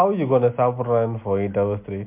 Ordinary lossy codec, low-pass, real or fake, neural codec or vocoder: MP3, 32 kbps; 3.6 kHz; real; none